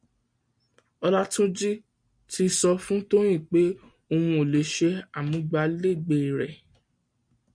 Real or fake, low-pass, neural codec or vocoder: real; 9.9 kHz; none